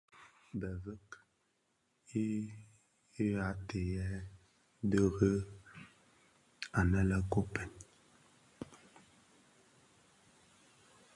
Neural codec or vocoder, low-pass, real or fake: none; 10.8 kHz; real